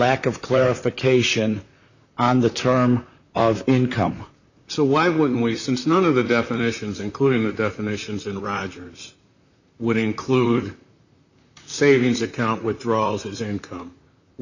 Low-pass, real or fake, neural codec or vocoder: 7.2 kHz; fake; vocoder, 44.1 kHz, 128 mel bands, Pupu-Vocoder